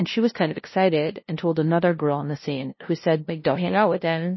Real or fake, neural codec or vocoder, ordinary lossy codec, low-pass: fake; codec, 16 kHz, 0.5 kbps, FunCodec, trained on LibriTTS, 25 frames a second; MP3, 24 kbps; 7.2 kHz